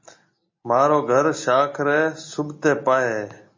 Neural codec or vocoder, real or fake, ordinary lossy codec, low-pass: none; real; MP3, 32 kbps; 7.2 kHz